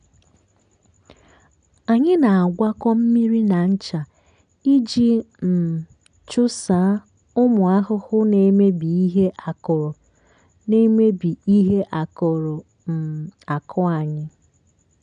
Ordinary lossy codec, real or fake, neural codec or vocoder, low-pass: none; real; none; 9.9 kHz